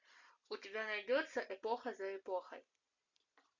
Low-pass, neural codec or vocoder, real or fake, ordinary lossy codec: 7.2 kHz; none; real; MP3, 48 kbps